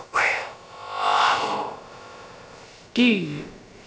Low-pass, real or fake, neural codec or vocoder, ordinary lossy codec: none; fake; codec, 16 kHz, about 1 kbps, DyCAST, with the encoder's durations; none